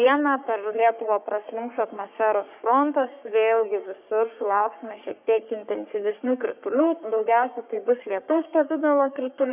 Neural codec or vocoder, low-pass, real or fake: codec, 44.1 kHz, 3.4 kbps, Pupu-Codec; 3.6 kHz; fake